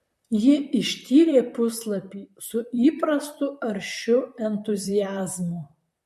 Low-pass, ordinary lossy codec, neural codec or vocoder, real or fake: 14.4 kHz; MP3, 64 kbps; vocoder, 44.1 kHz, 128 mel bands, Pupu-Vocoder; fake